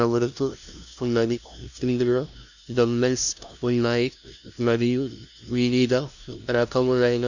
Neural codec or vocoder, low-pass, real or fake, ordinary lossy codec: codec, 16 kHz, 0.5 kbps, FunCodec, trained on LibriTTS, 25 frames a second; 7.2 kHz; fake; none